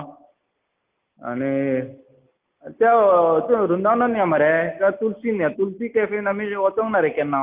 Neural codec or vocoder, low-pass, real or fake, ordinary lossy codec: none; 3.6 kHz; real; Opus, 24 kbps